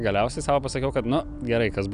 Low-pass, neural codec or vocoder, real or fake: 9.9 kHz; none; real